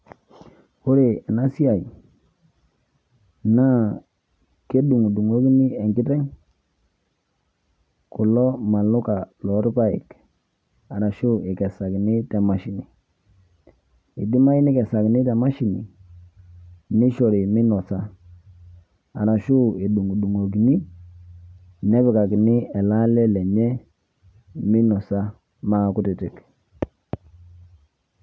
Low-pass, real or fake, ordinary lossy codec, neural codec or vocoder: none; real; none; none